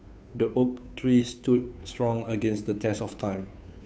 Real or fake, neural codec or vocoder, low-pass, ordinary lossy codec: fake; codec, 16 kHz, 2 kbps, FunCodec, trained on Chinese and English, 25 frames a second; none; none